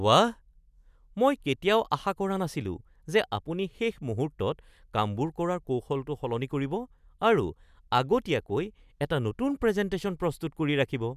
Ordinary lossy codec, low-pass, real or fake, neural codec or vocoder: none; 14.4 kHz; real; none